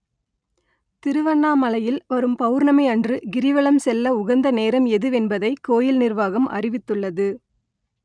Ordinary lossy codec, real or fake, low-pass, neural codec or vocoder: none; real; 9.9 kHz; none